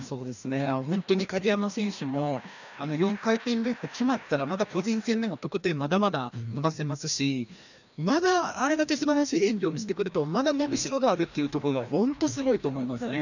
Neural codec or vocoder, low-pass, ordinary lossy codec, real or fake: codec, 16 kHz, 1 kbps, FreqCodec, larger model; 7.2 kHz; none; fake